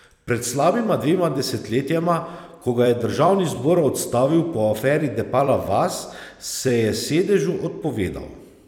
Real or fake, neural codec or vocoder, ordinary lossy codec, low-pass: fake; vocoder, 44.1 kHz, 128 mel bands every 256 samples, BigVGAN v2; none; 19.8 kHz